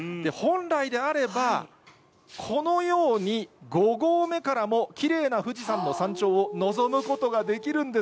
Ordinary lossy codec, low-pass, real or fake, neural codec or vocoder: none; none; real; none